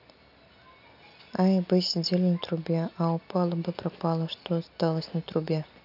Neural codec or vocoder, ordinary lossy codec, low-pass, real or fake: none; none; 5.4 kHz; real